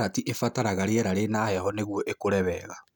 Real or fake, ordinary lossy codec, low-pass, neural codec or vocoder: real; none; none; none